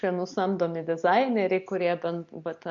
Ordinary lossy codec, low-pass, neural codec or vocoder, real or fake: AAC, 64 kbps; 7.2 kHz; none; real